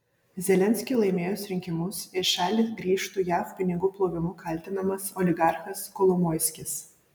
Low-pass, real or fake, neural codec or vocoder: 19.8 kHz; real; none